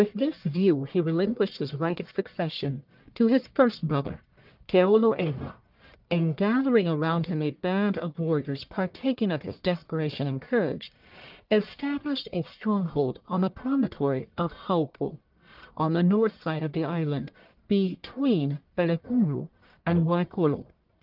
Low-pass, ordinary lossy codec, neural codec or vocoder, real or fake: 5.4 kHz; Opus, 24 kbps; codec, 44.1 kHz, 1.7 kbps, Pupu-Codec; fake